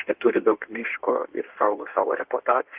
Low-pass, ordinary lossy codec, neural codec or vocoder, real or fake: 3.6 kHz; Opus, 32 kbps; codec, 16 kHz in and 24 kHz out, 1.1 kbps, FireRedTTS-2 codec; fake